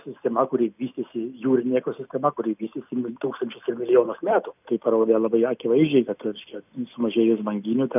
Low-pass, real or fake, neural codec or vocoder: 3.6 kHz; real; none